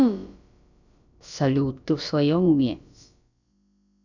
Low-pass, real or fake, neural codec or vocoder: 7.2 kHz; fake; codec, 16 kHz, about 1 kbps, DyCAST, with the encoder's durations